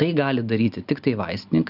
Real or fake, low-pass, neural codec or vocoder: real; 5.4 kHz; none